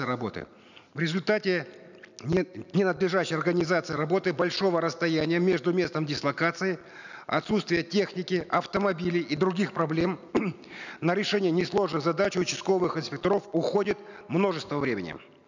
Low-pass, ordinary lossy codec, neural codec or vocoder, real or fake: 7.2 kHz; none; none; real